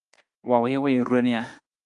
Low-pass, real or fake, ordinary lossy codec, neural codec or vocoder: 10.8 kHz; fake; Opus, 32 kbps; autoencoder, 48 kHz, 32 numbers a frame, DAC-VAE, trained on Japanese speech